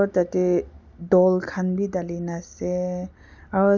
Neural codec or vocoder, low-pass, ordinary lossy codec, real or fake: none; 7.2 kHz; none; real